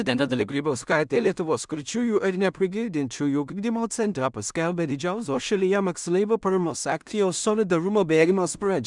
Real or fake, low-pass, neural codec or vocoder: fake; 10.8 kHz; codec, 16 kHz in and 24 kHz out, 0.4 kbps, LongCat-Audio-Codec, two codebook decoder